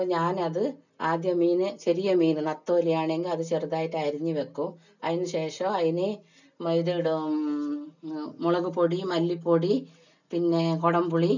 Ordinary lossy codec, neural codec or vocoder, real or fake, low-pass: none; none; real; 7.2 kHz